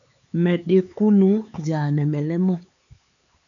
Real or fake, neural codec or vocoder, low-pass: fake; codec, 16 kHz, 4 kbps, X-Codec, HuBERT features, trained on LibriSpeech; 7.2 kHz